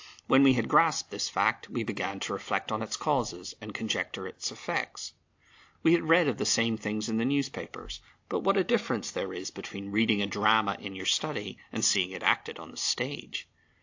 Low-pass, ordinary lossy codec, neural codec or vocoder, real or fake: 7.2 kHz; AAC, 48 kbps; none; real